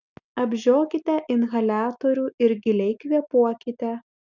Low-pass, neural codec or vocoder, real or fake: 7.2 kHz; none; real